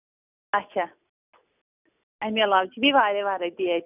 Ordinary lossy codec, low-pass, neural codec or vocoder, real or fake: none; 3.6 kHz; none; real